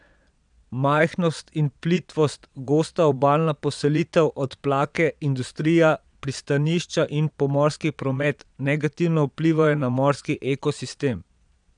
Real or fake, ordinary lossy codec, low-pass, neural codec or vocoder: fake; none; 9.9 kHz; vocoder, 22.05 kHz, 80 mel bands, Vocos